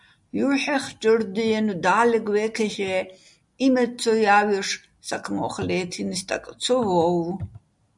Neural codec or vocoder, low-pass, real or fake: none; 10.8 kHz; real